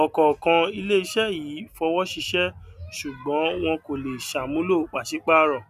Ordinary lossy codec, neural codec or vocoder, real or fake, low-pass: none; none; real; 14.4 kHz